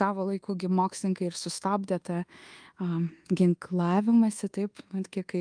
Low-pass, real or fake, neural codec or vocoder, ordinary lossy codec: 9.9 kHz; fake; codec, 24 kHz, 1.2 kbps, DualCodec; Opus, 32 kbps